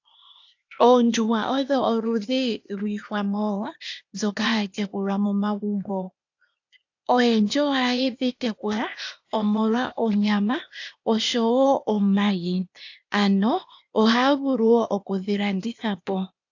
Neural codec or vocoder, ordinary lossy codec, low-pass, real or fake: codec, 16 kHz, 0.8 kbps, ZipCodec; AAC, 48 kbps; 7.2 kHz; fake